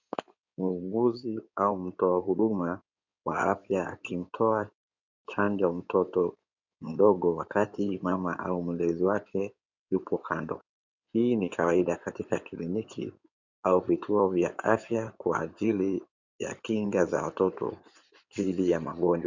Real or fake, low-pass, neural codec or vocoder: fake; 7.2 kHz; codec, 16 kHz in and 24 kHz out, 2.2 kbps, FireRedTTS-2 codec